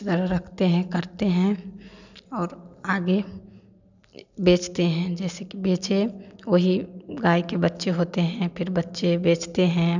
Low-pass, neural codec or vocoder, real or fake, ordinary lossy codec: 7.2 kHz; none; real; none